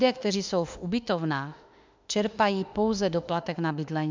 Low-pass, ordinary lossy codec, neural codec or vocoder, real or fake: 7.2 kHz; MP3, 64 kbps; autoencoder, 48 kHz, 32 numbers a frame, DAC-VAE, trained on Japanese speech; fake